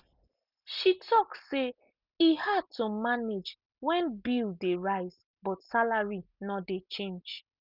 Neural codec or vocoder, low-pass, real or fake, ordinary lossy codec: none; 5.4 kHz; real; none